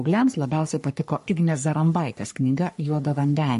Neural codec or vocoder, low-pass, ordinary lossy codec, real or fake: codec, 44.1 kHz, 3.4 kbps, Pupu-Codec; 14.4 kHz; MP3, 48 kbps; fake